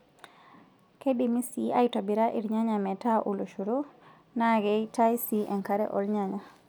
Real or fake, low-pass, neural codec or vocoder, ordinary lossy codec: real; 19.8 kHz; none; none